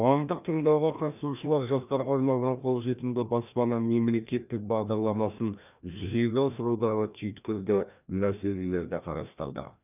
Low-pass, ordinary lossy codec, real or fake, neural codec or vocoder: 3.6 kHz; none; fake; codec, 16 kHz, 1 kbps, FreqCodec, larger model